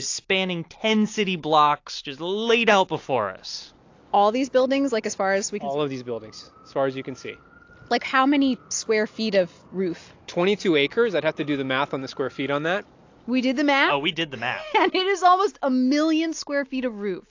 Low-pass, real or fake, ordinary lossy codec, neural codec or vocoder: 7.2 kHz; real; AAC, 48 kbps; none